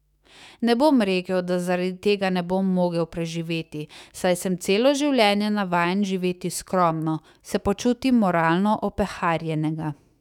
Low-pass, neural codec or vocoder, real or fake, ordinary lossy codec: 19.8 kHz; autoencoder, 48 kHz, 128 numbers a frame, DAC-VAE, trained on Japanese speech; fake; none